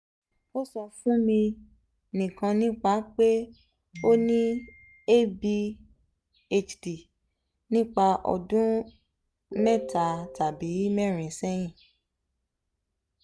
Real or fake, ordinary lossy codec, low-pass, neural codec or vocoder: real; none; none; none